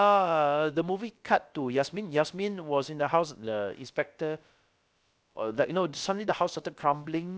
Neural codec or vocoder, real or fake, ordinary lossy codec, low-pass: codec, 16 kHz, about 1 kbps, DyCAST, with the encoder's durations; fake; none; none